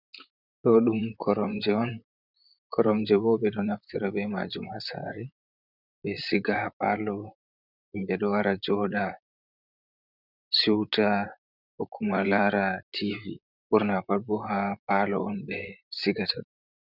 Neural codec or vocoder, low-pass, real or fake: vocoder, 44.1 kHz, 128 mel bands, Pupu-Vocoder; 5.4 kHz; fake